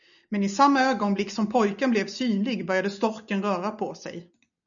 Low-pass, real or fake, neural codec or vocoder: 7.2 kHz; real; none